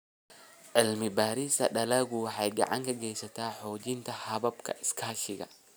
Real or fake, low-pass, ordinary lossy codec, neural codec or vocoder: real; none; none; none